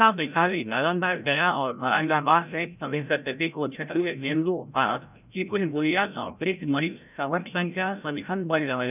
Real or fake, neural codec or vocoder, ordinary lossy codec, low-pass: fake; codec, 16 kHz, 0.5 kbps, FreqCodec, larger model; none; 3.6 kHz